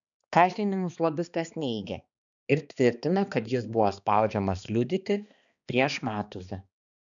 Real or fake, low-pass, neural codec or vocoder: fake; 7.2 kHz; codec, 16 kHz, 2 kbps, X-Codec, HuBERT features, trained on balanced general audio